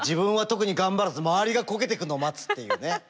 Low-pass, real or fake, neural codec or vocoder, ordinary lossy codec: none; real; none; none